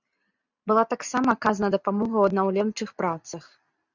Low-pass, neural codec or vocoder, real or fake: 7.2 kHz; none; real